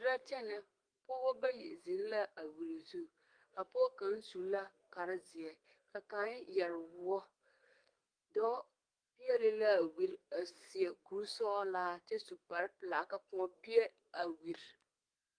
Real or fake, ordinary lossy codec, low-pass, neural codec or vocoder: fake; Opus, 24 kbps; 9.9 kHz; codec, 32 kHz, 1.9 kbps, SNAC